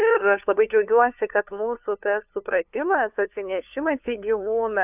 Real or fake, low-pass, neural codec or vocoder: fake; 3.6 kHz; codec, 16 kHz, 2 kbps, FunCodec, trained on LibriTTS, 25 frames a second